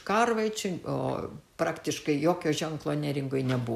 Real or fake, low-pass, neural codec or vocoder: real; 14.4 kHz; none